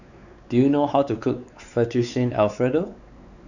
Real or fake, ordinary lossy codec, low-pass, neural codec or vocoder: fake; none; 7.2 kHz; codec, 16 kHz, 4 kbps, X-Codec, WavLM features, trained on Multilingual LibriSpeech